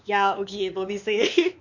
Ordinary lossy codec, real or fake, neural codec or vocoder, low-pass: none; fake; codec, 16 kHz, 2 kbps, X-Codec, WavLM features, trained on Multilingual LibriSpeech; 7.2 kHz